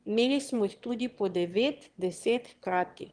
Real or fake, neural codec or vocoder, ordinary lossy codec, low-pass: fake; autoencoder, 22.05 kHz, a latent of 192 numbers a frame, VITS, trained on one speaker; Opus, 24 kbps; 9.9 kHz